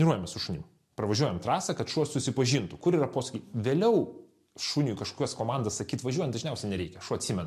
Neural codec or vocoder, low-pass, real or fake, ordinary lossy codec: none; 14.4 kHz; real; MP3, 64 kbps